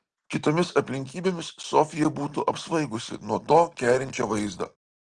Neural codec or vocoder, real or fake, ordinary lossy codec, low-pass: none; real; Opus, 16 kbps; 9.9 kHz